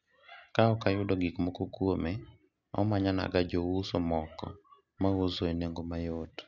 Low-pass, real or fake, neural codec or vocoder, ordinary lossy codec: 7.2 kHz; real; none; none